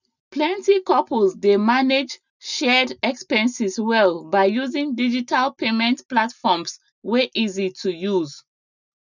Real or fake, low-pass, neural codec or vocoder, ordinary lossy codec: real; 7.2 kHz; none; none